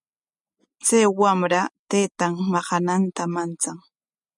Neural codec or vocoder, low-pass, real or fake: none; 10.8 kHz; real